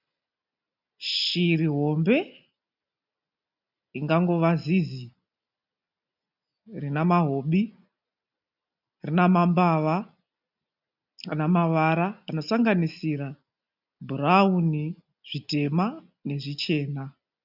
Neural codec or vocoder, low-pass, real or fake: none; 5.4 kHz; real